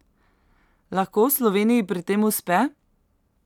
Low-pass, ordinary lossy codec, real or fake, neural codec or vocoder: 19.8 kHz; none; real; none